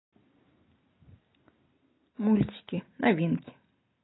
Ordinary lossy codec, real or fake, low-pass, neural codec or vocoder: AAC, 16 kbps; real; 7.2 kHz; none